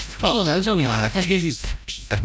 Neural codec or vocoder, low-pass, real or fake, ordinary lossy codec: codec, 16 kHz, 0.5 kbps, FreqCodec, larger model; none; fake; none